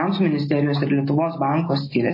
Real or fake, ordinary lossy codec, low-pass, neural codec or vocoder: real; MP3, 24 kbps; 5.4 kHz; none